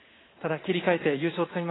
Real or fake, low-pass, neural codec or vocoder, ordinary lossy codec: fake; 7.2 kHz; vocoder, 44.1 kHz, 80 mel bands, Vocos; AAC, 16 kbps